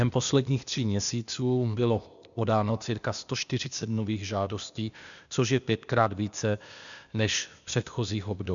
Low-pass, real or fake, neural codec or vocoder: 7.2 kHz; fake; codec, 16 kHz, 0.8 kbps, ZipCodec